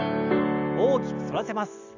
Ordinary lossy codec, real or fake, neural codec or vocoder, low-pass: none; real; none; 7.2 kHz